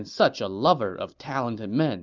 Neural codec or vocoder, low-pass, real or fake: none; 7.2 kHz; real